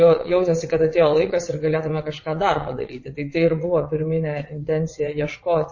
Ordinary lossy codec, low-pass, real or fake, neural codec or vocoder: MP3, 32 kbps; 7.2 kHz; fake; vocoder, 22.05 kHz, 80 mel bands, WaveNeXt